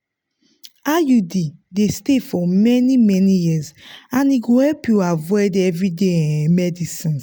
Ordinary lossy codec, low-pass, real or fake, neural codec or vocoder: none; none; real; none